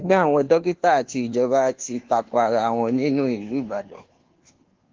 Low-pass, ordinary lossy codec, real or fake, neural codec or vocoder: 7.2 kHz; Opus, 16 kbps; fake; codec, 24 kHz, 1.2 kbps, DualCodec